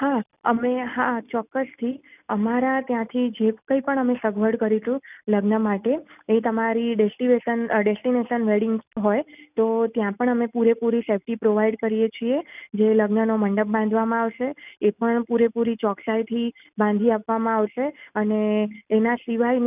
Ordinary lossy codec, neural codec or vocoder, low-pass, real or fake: none; none; 3.6 kHz; real